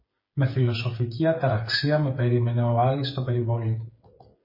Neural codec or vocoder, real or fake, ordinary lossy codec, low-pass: codec, 16 kHz, 8 kbps, FreqCodec, smaller model; fake; MP3, 24 kbps; 5.4 kHz